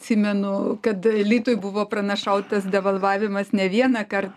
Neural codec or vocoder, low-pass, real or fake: none; 14.4 kHz; real